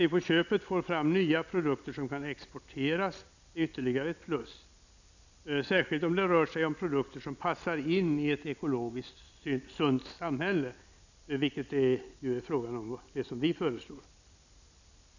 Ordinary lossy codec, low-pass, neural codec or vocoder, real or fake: none; 7.2 kHz; none; real